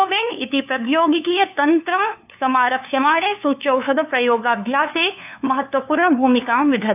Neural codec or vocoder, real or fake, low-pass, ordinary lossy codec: codec, 16 kHz, 2 kbps, FunCodec, trained on LibriTTS, 25 frames a second; fake; 3.6 kHz; AAC, 32 kbps